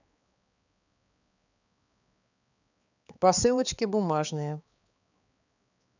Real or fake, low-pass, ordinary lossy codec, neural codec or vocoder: fake; 7.2 kHz; none; codec, 16 kHz, 4 kbps, X-Codec, HuBERT features, trained on balanced general audio